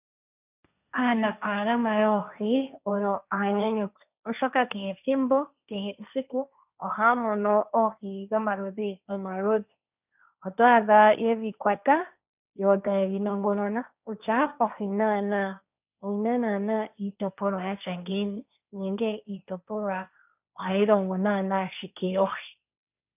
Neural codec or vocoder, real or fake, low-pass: codec, 16 kHz, 1.1 kbps, Voila-Tokenizer; fake; 3.6 kHz